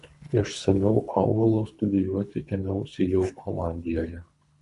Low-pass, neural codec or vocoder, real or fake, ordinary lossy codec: 10.8 kHz; codec, 24 kHz, 3 kbps, HILCodec; fake; AAC, 64 kbps